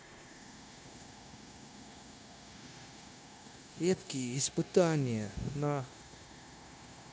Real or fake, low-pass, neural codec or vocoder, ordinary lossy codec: fake; none; codec, 16 kHz, 0.9 kbps, LongCat-Audio-Codec; none